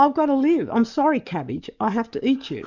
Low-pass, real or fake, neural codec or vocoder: 7.2 kHz; fake; codec, 44.1 kHz, 7.8 kbps, DAC